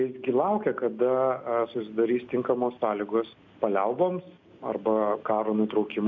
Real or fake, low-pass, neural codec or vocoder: real; 7.2 kHz; none